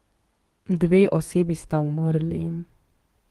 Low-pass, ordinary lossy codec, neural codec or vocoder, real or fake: 14.4 kHz; Opus, 24 kbps; codec, 32 kHz, 1.9 kbps, SNAC; fake